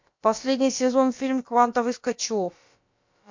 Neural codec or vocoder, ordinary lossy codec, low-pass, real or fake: codec, 16 kHz, about 1 kbps, DyCAST, with the encoder's durations; MP3, 48 kbps; 7.2 kHz; fake